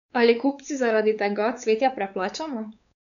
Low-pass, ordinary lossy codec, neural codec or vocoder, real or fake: 7.2 kHz; none; codec, 16 kHz, 4 kbps, X-Codec, WavLM features, trained on Multilingual LibriSpeech; fake